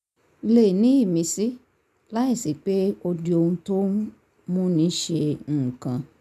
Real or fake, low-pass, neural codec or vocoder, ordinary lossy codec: real; 14.4 kHz; none; none